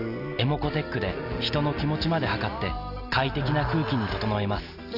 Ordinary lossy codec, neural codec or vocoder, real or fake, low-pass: none; none; real; 5.4 kHz